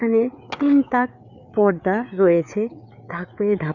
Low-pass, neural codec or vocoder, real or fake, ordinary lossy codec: 7.2 kHz; codec, 16 kHz, 4 kbps, FreqCodec, larger model; fake; none